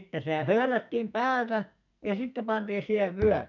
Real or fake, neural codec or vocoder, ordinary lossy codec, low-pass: fake; codec, 44.1 kHz, 2.6 kbps, SNAC; none; 7.2 kHz